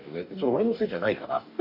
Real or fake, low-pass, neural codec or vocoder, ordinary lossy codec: fake; 5.4 kHz; codec, 44.1 kHz, 2.6 kbps, DAC; none